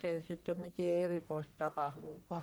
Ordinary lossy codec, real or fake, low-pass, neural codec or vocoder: none; fake; none; codec, 44.1 kHz, 1.7 kbps, Pupu-Codec